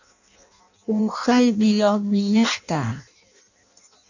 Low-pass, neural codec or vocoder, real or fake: 7.2 kHz; codec, 16 kHz in and 24 kHz out, 0.6 kbps, FireRedTTS-2 codec; fake